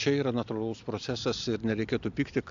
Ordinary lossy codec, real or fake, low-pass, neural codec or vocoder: AAC, 64 kbps; real; 7.2 kHz; none